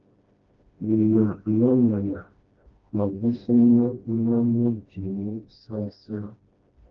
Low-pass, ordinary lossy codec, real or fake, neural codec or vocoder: 7.2 kHz; Opus, 24 kbps; fake; codec, 16 kHz, 1 kbps, FreqCodec, smaller model